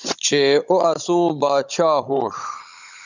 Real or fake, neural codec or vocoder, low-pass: fake; codec, 16 kHz, 16 kbps, FunCodec, trained on Chinese and English, 50 frames a second; 7.2 kHz